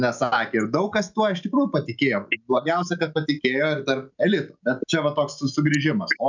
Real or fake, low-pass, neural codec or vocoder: real; 7.2 kHz; none